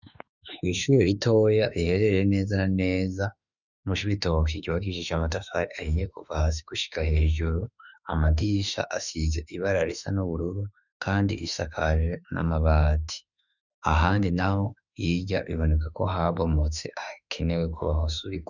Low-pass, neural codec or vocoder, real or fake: 7.2 kHz; autoencoder, 48 kHz, 32 numbers a frame, DAC-VAE, trained on Japanese speech; fake